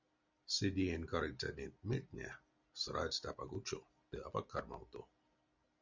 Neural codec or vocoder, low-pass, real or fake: none; 7.2 kHz; real